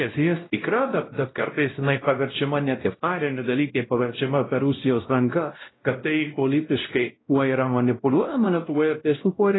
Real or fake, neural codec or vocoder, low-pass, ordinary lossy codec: fake; codec, 16 kHz, 0.5 kbps, X-Codec, WavLM features, trained on Multilingual LibriSpeech; 7.2 kHz; AAC, 16 kbps